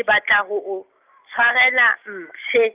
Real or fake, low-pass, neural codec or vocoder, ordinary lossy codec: real; 3.6 kHz; none; Opus, 32 kbps